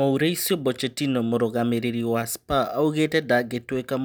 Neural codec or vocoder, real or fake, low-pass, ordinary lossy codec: none; real; none; none